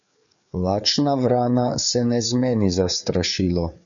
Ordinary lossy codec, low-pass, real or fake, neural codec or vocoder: MP3, 96 kbps; 7.2 kHz; fake; codec, 16 kHz, 4 kbps, FreqCodec, larger model